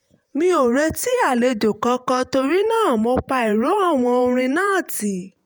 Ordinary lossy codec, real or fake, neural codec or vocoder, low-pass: none; fake; vocoder, 48 kHz, 128 mel bands, Vocos; none